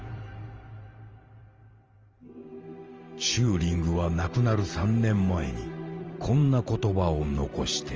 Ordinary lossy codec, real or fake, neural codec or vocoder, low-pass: Opus, 24 kbps; fake; vocoder, 44.1 kHz, 128 mel bands every 512 samples, BigVGAN v2; 7.2 kHz